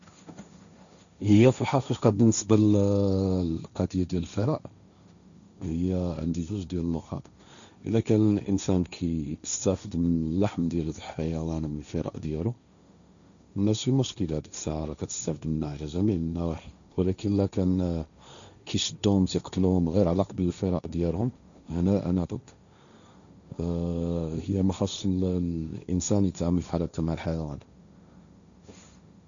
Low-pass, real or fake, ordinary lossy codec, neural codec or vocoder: 7.2 kHz; fake; none; codec, 16 kHz, 1.1 kbps, Voila-Tokenizer